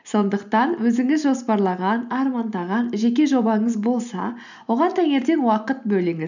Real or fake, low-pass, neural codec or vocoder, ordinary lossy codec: real; 7.2 kHz; none; none